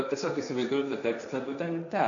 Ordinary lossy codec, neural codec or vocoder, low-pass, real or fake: MP3, 96 kbps; codec, 16 kHz, 1.1 kbps, Voila-Tokenizer; 7.2 kHz; fake